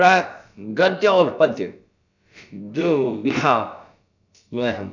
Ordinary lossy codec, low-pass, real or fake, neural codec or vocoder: none; 7.2 kHz; fake; codec, 16 kHz, about 1 kbps, DyCAST, with the encoder's durations